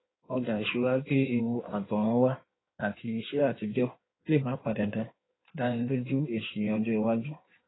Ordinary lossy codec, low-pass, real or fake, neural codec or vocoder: AAC, 16 kbps; 7.2 kHz; fake; codec, 16 kHz in and 24 kHz out, 1.1 kbps, FireRedTTS-2 codec